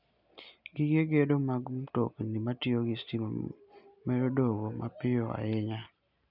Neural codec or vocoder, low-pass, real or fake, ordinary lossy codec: none; 5.4 kHz; real; none